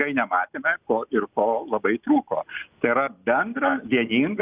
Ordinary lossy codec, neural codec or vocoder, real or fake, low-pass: Opus, 64 kbps; none; real; 3.6 kHz